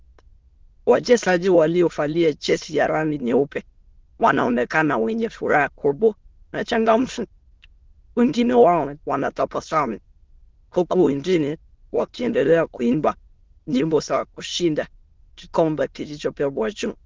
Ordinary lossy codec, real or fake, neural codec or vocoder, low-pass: Opus, 16 kbps; fake; autoencoder, 22.05 kHz, a latent of 192 numbers a frame, VITS, trained on many speakers; 7.2 kHz